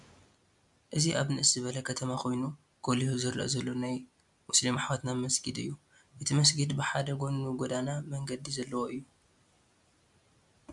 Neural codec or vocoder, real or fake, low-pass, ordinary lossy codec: none; real; 10.8 kHz; MP3, 96 kbps